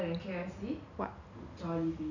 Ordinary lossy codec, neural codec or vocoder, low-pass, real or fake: none; none; 7.2 kHz; real